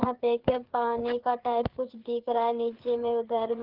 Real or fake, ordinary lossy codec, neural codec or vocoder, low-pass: fake; Opus, 16 kbps; codec, 16 kHz, 4 kbps, FreqCodec, larger model; 5.4 kHz